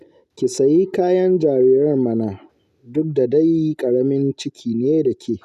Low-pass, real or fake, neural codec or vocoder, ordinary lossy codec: 14.4 kHz; real; none; none